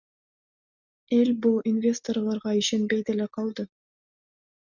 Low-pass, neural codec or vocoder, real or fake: 7.2 kHz; none; real